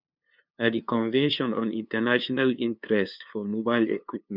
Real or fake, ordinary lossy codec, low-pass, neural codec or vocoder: fake; none; 5.4 kHz; codec, 16 kHz, 2 kbps, FunCodec, trained on LibriTTS, 25 frames a second